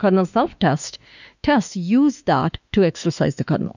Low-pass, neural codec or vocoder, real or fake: 7.2 kHz; autoencoder, 48 kHz, 32 numbers a frame, DAC-VAE, trained on Japanese speech; fake